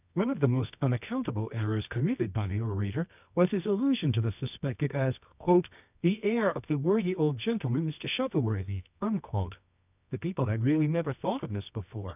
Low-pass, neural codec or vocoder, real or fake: 3.6 kHz; codec, 24 kHz, 0.9 kbps, WavTokenizer, medium music audio release; fake